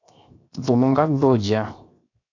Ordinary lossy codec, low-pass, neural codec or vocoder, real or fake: AAC, 48 kbps; 7.2 kHz; codec, 16 kHz, 0.7 kbps, FocalCodec; fake